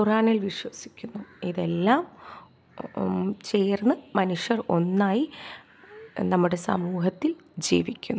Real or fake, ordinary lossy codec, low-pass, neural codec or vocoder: real; none; none; none